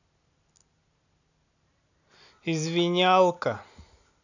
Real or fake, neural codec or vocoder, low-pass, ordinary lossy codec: real; none; 7.2 kHz; none